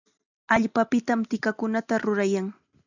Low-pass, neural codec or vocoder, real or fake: 7.2 kHz; none; real